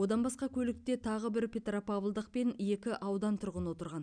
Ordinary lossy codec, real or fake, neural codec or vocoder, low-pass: none; real; none; 9.9 kHz